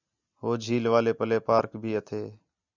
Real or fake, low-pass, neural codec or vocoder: real; 7.2 kHz; none